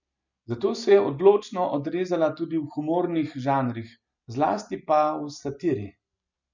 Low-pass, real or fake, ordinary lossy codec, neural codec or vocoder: 7.2 kHz; real; none; none